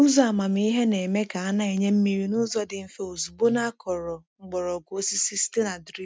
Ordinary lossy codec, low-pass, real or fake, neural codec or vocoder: none; none; real; none